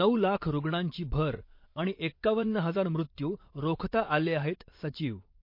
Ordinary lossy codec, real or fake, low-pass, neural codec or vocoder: MP3, 32 kbps; fake; 5.4 kHz; vocoder, 22.05 kHz, 80 mel bands, Vocos